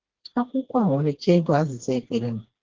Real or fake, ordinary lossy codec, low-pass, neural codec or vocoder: fake; Opus, 16 kbps; 7.2 kHz; codec, 16 kHz, 2 kbps, FreqCodec, smaller model